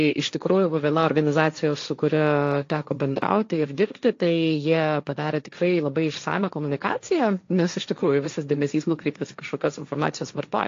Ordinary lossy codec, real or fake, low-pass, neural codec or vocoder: AAC, 48 kbps; fake; 7.2 kHz; codec, 16 kHz, 1.1 kbps, Voila-Tokenizer